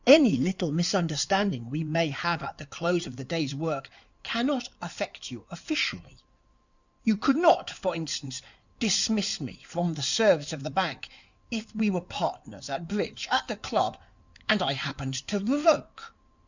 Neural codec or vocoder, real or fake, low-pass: codec, 16 kHz in and 24 kHz out, 2.2 kbps, FireRedTTS-2 codec; fake; 7.2 kHz